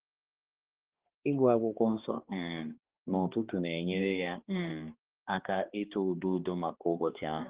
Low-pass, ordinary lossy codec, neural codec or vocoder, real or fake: 3.6 kHz; Opus, 16 kbps; codec, 16 kHz, 2 kbps, X-Codec, HuBERT features, trained on balanced general audio; fake